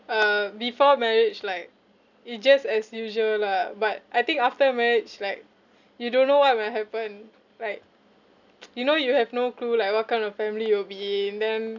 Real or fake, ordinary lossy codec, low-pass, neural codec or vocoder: real; none; 7.2 kHz; none